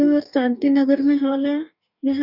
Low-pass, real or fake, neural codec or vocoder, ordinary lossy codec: 5.4 kHz; fake; codec, 44.1 kHz, 2.6 kbps, DAC; none